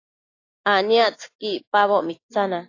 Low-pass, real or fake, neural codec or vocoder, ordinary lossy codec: 7.2 kHz; real; none; AAC, 32 kbps